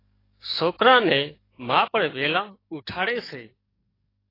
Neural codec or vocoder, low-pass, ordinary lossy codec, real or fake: codec, 44.1 kHz, 7.8 kbps, DAC; 5.4 kHz; AAC, 24 kbps; fake